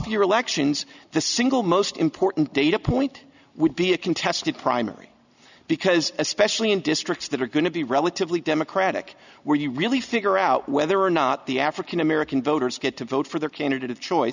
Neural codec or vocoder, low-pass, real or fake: none; 7.2 kHz; real